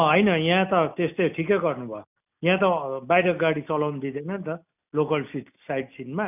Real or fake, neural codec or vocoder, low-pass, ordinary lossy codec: real; none; 3.6 kHz; none